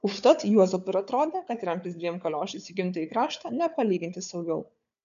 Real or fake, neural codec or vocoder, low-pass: fake; codec, 16 kHz, 4 kbps, FunCodec, trained on Chinese and English, 50 frames a second; 7.2 kHz